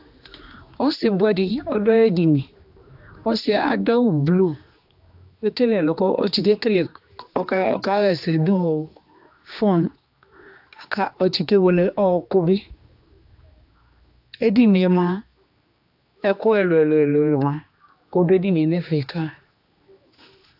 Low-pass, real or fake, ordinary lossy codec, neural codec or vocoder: 5.4 kHz; fake; AAC, 48 kbps; codec, 16 kHz, 2 kbps, X-Codec, HuBERT features, trained on general audio